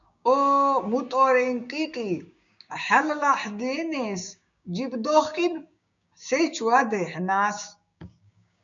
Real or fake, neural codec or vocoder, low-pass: fake; codec, 16 kHz, 6 kbps, DAC; 7.2 kHz